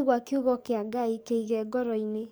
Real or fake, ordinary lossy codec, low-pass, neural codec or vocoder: fake; none; none; codec, 44.1 kHz, 7.8 kbps, DAC